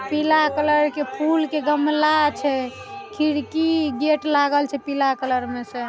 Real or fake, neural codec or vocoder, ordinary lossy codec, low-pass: real; none; none; none